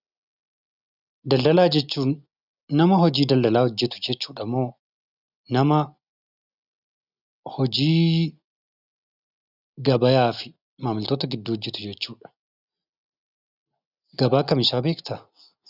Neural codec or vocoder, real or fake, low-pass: none; real; 5.4 kHz